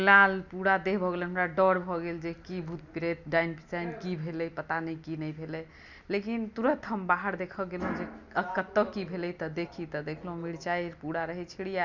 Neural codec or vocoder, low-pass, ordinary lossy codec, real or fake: none; 7.2 kHz; none; real